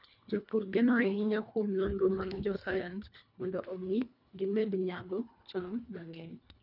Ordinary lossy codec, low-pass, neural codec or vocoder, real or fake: none; 5.4 kHz; codec, 24 kHz, 1.5 kbps, HILCodec; fake